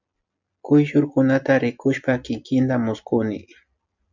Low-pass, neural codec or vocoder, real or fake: 7.2 kHz; none; real